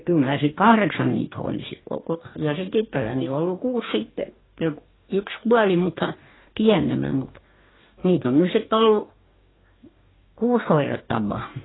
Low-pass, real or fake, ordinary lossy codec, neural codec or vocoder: 7.2 kHz; fake; AAC, 16 kbps; codec, 44.1 kHz, 2.6 kbps, DAC